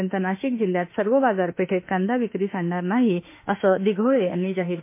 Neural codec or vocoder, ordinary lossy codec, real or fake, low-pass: autoencoder, 48 kHz, 32 numbers a frame, DAC-VAE, trained on Japanese speech; MP3, 24 kbps; fake; 3.6 kHz